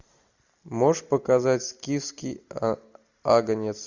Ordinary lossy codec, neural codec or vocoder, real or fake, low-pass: Opus, 64 kbps; none; real; 7.2 kHz